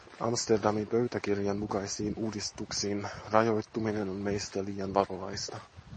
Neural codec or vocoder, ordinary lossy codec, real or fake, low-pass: none; MP3, 32 kbps; real; 10.8 kHz